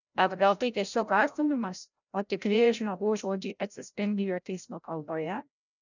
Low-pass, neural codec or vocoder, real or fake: 7.2 kHz; codec, 16 kHz, 0.5 kbps, FreqCodec, larger model; fake